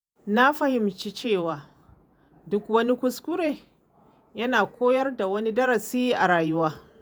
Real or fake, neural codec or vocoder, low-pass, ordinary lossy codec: fake; vocoder, 48 kHz, 128 mel bands, Vocos; none; none